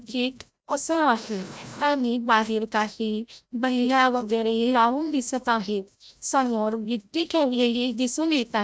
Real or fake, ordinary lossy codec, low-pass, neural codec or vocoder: fake; none; none; codec, 16 kHz, 0.5 kbps, FreqCodec, larger model